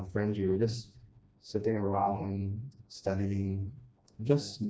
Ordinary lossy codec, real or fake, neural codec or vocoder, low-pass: none; fake; codec, 16 kHz, 1 kbps, FreqCodec, smaller model; none